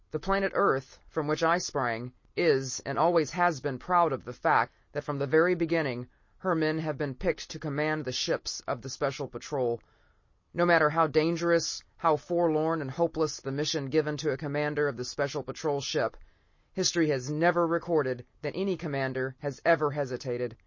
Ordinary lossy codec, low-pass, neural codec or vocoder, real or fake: MP3, 32 kbps; 7.2 kHz; none; real